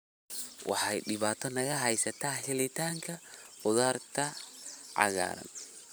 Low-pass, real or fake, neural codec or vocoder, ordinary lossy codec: none; fake; vocoder, 44.1 kHz, 128 mel bands every 512 samples, BigVGAN v2; none